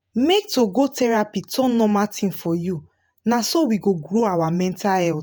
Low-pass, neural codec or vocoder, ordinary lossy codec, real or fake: none; vocoder, 48 kHz, 128 mel bands, Vocos; none; fake